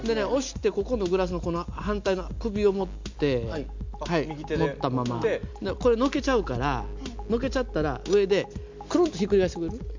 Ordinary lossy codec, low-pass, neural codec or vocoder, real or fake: MP3, 64 kbps; 7.2 kHz; none; real